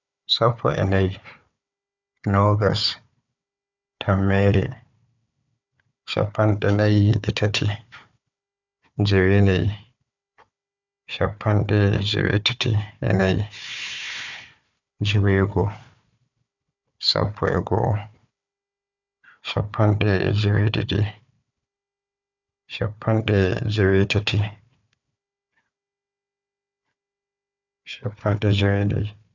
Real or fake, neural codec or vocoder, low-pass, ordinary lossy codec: fake; codec, 16 kHz, 4 kbps, FunCodec, trained on Chinese and English, 50 frames a second; 7.2 kHz; none